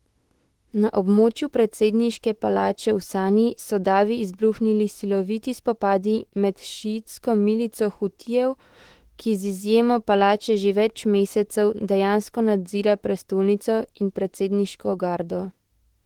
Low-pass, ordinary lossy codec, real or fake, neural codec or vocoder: 19.8 kHz; Opus, 16 kbps; fake; autoencoder, 48 kHz, 32 numbers a frame, DAC-VAE, trained on Japanese speech